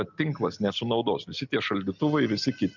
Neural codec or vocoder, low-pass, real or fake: none; 7.2 kHz; real